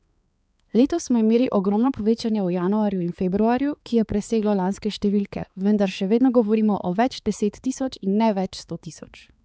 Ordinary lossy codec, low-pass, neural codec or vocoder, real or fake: none; none; codec, 16 kHz, 4 kbps, X-Codec, HuBERT features, trained on balanced general audio; fake